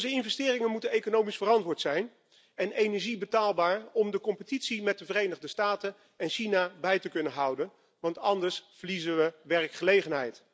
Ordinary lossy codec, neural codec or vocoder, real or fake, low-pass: none; none; real; none